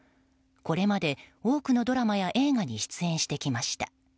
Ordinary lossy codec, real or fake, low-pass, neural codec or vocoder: none; real; none; none